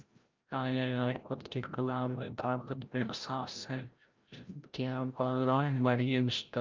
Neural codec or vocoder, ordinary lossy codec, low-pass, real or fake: codec, 16 kHz, 0.5 kbps, FreqCodec, larger model; Opus, 24 kbps; 7.2 kHz; fake